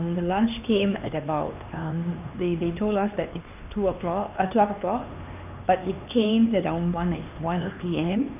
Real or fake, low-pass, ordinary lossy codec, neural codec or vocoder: fake; 3.6 kHz; none; codec, 16 kHz, 2 kbps, X-Codec, HuBERT features, trained on LibriSpeech